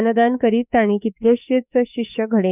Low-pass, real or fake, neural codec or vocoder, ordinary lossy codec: 3.6 kHz; fake; autoencoder, 48 kHz, 32 numbers a frame, DAC-VAE, trained on Japanese speech; none